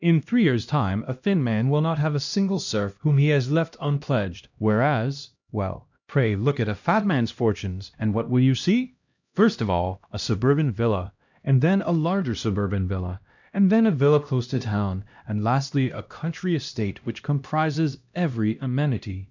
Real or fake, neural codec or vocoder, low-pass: fake; codec, 16 kHz, 1 kbps, X-Codec, HuBERT features, trained on LibriSpeech; 7.2 kHz